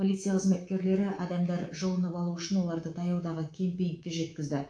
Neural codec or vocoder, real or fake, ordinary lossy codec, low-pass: codec, 24 kHz, 3.1 kbps, DualCodec; fake; AAC, 48 kbps; 9.9 kHz